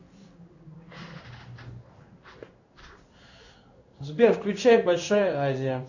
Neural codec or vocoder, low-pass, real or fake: codec, 16 kHz in and 24 kHz out, 1 kbps, XY-Tokenizer; 7.2 kHz; fake